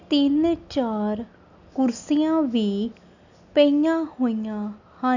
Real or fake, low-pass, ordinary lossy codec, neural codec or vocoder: real; 7.2 kHz; none; none